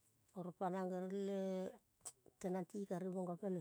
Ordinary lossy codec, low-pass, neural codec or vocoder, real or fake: none; none; autoencoder, 48 kHz, 128 numbers a frame, DAC-VAE, trained on Japanese speech; fake